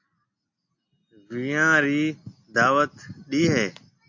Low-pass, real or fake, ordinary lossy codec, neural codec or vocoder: 7.2 kHz; real; AAC, 48 kbps; none